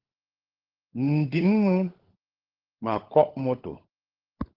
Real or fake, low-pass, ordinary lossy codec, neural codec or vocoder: fake; 5.4 kHz; Opus, 16 kbps; codec, 16 kHz, 16 kbps, FunCodec, trained on LibriTTS, 50 frames a second